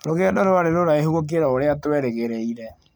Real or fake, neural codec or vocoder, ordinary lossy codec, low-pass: real; none; none; none